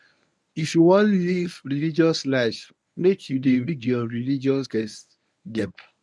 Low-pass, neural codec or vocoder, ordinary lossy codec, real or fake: none; codec, 24 kHz, 0.9 kbps, WavTokenizer, medium speech release version 1; none; fake